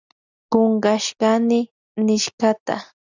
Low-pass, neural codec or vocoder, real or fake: 7.2 kHz; none; real